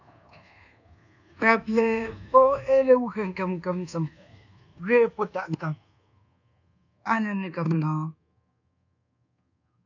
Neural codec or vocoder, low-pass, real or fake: codec, 24 kHz, 1.2 kbps, DualCodec; 7.2 kHz; fake